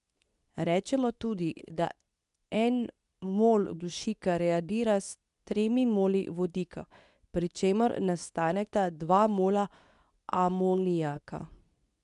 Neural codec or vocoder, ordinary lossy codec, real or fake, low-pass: codec, 24 kHz, 0.9 kbps, WavTokenizer, medium speech release version 2; none; fake; 10.8 kHz